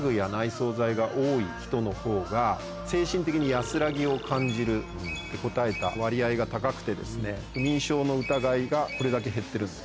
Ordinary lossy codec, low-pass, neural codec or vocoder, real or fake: none; none; none; real